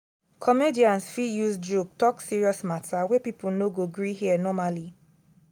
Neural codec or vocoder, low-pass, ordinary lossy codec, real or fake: none; none; none; real